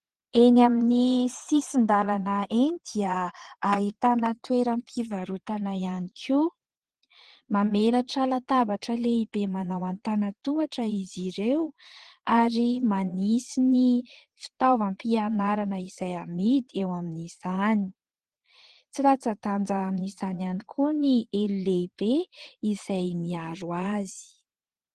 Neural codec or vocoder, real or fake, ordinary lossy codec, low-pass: vocoder, 22.05 kHz, 80 mel bands, Vocos; fake; Opus, 16 kbps; 9.9 kHz